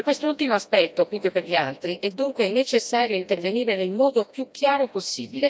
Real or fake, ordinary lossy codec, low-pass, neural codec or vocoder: fake; none; none; codec, 16 kHz, 1 kbps, FreqCodec, smaller model